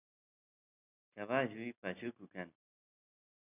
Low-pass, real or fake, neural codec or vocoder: 3.6 kHz; real; none